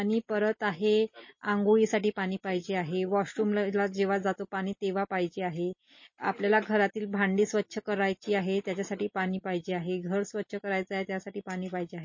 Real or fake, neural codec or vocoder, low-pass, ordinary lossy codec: real; none; 7.2 kHz; MP3, 32 kbps